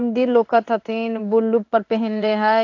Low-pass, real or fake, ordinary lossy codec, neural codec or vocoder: 7.2 kHz; fake; AAC, 48 kbps; codec, 16 kHz in and 24 kHz out, 1 kbps, XY-Tokenizer